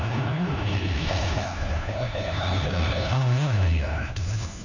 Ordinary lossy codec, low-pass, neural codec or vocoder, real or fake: none; 7.2 kHz; codec, 16 kHz, 1 kbps, FunCodec, trained on LibriTTS, 50 frames a second; fake